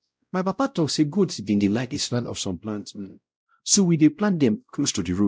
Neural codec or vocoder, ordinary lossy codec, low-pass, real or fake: codec, 16 kHz, 0.5 kbps, X-Codec, WavLM features, trained on Multilingual LibriSpeech; none; none; fake